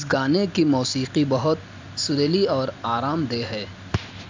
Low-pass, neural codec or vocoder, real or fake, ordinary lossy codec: 7.2 kHz; none; real; MP3, 64 kbps